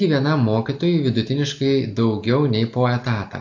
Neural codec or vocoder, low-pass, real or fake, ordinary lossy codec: none; 7.2 kHz; real; AAC, 48 kbps